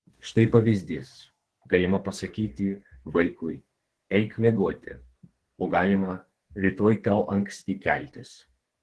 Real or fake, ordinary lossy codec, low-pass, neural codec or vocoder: fake; Opus, 16 kbps; 10.8 kHz; codec, 32 kHz, 1.9 kbps, SNAC